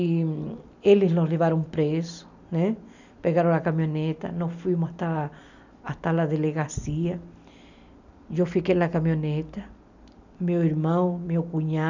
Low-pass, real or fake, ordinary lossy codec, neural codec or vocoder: 7.2 kHz; real; none; none